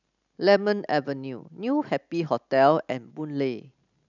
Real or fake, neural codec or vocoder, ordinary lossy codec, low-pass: real; none; none; 7.2 kHz